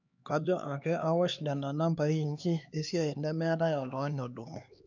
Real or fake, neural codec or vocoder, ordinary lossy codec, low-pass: fake; codec, 16 kHz, 2 kbps, X-Codec, HuBERT features, trained on LibriSpeech; none; 7.2 kHz